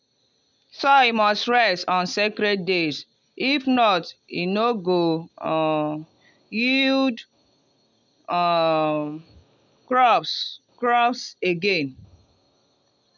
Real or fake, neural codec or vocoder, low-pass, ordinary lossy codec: real; none; 7.2 kHz; none